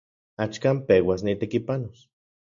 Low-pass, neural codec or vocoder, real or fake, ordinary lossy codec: 7.2 kHz; none; real; MP3, 64 kbps